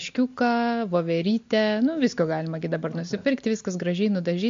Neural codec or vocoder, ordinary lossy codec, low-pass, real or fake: none; MP3, 48 kbps; 7.2 kHz; real